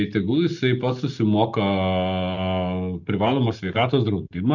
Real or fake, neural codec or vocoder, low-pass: real; none; 7.2 kHz